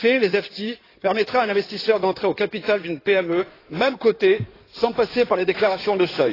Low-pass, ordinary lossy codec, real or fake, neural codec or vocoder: 5.4 kHz; AAC, 24 kbps; fake; codec, 16 kHz in and 24 kHz out, 2.2 kbps, FireRedTTS-2 codec